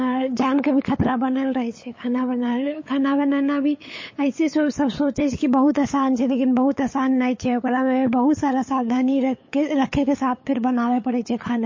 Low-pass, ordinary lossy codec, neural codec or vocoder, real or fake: 7.2 kHz; MP3, 32 kbps; codec, 16 kHz, 8 kbps, FunCodec, trained on LibriTTS, 25 frames a second; fake